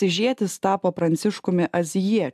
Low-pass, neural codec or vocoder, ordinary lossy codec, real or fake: 14.4 kHz; none; AAC, 96 kbps; real